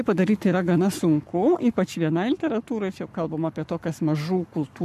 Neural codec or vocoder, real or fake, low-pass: codec, 44.1 kHz, 7.8 kbps, Pupu-Codec; fake; 14.4 kHz